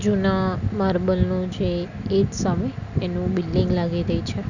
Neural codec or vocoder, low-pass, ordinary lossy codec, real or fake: none; 7.2 kHz; none; real